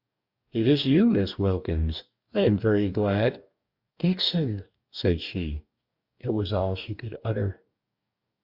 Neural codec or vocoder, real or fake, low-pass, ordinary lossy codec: codec, 44.1 kHz, 2.6 kbps, DAC; fake; 5.4 kHz; Opus, 64 kbps